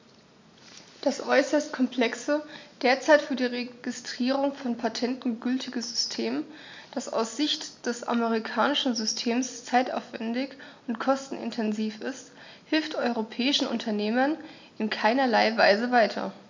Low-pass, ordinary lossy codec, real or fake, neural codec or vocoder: 7.2 kHz; MP3, 64 kbps; real; none